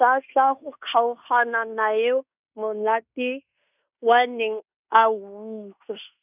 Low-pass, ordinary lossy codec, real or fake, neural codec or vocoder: 3.6 kHz; none; fake; codec, 16 kHz in and 24 kHz out, 0.9 kbps, LongCat-Audio-Codec, fine tuned four codebook decoder